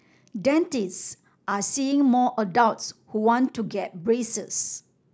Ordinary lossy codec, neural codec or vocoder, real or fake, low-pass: none; none; real; none